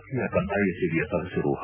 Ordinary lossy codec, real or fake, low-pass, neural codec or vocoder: none; real; 3.6 kHz; none